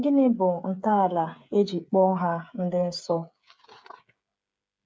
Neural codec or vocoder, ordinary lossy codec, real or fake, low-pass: codec, 16 kHz, 8 kbps, FreqCodec, smaller model; none; fake; none